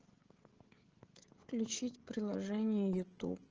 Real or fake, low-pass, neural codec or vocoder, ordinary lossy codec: fake; 7.2 kHz; codec, 16 kHz, 16 kbps, FreqCodec, smaller model; Opus, 16 kbps